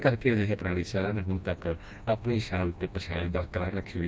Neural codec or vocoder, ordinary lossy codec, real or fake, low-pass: codec, 16 kHz, 1 kbps, FreqCodec, smaller model; none; fake; none